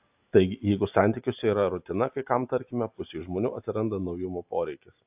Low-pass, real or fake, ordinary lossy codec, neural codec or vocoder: 3.6 kHz; real; AAC, 32 kbps; none